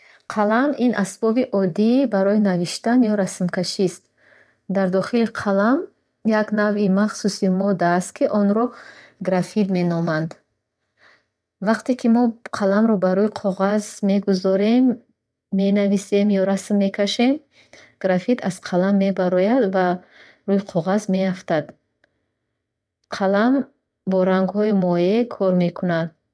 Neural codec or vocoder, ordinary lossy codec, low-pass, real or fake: vocoder, 22.05 kHz, 80 mel bands, WaveNeXt; none; none; fake